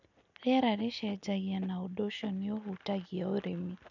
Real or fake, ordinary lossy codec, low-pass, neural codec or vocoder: real; none; 7.2 kHz; none